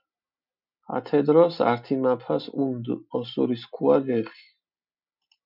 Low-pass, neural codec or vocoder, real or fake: 5.4 kHz; none; real